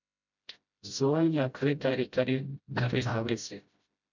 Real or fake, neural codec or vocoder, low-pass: fake; codec, 16 kHz, 0.5 kbps, FreqCodec, smaller model; 7.2 kHz